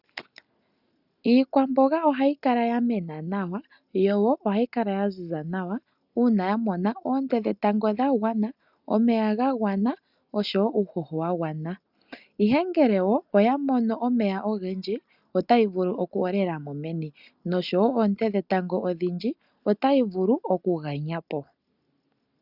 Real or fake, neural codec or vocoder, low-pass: real; none; 5.4 kHz